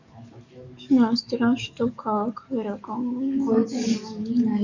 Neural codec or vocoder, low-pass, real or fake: codec, 44.1 kHz, 7.8 kbps, DAC; 7.2 kHz; fake